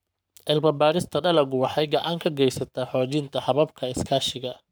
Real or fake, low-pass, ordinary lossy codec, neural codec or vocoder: fake; none; none; codec, 44.1 kHz, 7.8 kbps, Pupu-Codec